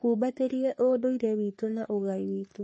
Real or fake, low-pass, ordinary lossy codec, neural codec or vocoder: fake; 10.8 kHz; MP3, 32 kbps; codec, 44.1 kHz, 3.4 kbps, Pupu-Codec